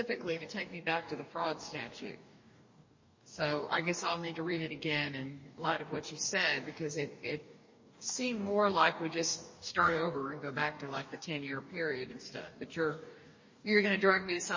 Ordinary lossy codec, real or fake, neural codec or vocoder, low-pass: MP3, 32 kbps; fake; codec, 44.1 kHz, 2.6 kbps, DAC; 7.2 kHz